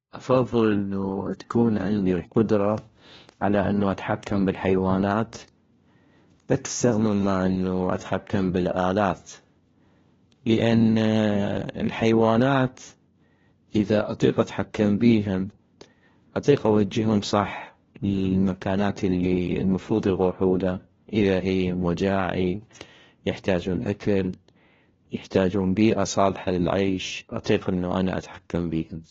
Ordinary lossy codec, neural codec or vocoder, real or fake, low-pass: AAC, 24 kbps; codec, 16 kHz, 1 kbps, FunCodec, trained on LibriTTS, 50 frames a second; fake; 7.2 kHz